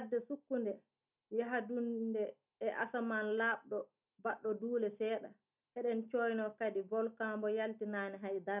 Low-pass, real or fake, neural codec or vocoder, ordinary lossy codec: 3.6 kHz; real; none; none